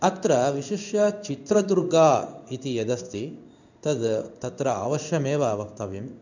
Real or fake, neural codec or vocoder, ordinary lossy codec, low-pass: fake; codec, 16 kHz in and 24 kHz out, 1 kbps, XY-Tokenizer; none; 7.2 kHz